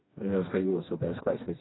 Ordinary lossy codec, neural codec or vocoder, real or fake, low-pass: AAC, 16 kbps; codec, 16 kHz, 2 kbps, FreqCodec, smaller model; fake; 7.2 kHz